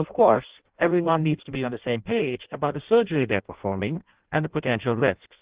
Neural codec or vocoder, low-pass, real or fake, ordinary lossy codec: codec, 16 kHz in and 24 kHz out, 0.6 kbps, FireRedTTS-2 codec; 3.6 kHz; fake; Opus, 16 kbps